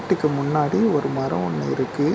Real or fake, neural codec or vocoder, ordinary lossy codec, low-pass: real; none; none; none